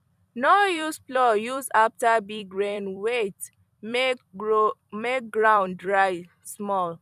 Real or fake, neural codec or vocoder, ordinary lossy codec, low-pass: fake; vocoder, 44.1 kHz, 128 mel bands every 256 samples, BigVGAN v2; none; 14.4 kHz